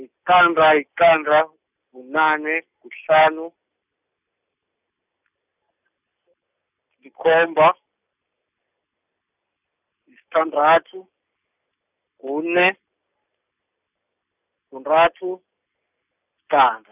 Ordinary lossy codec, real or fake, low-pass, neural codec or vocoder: none; real; 3.6 kHz; none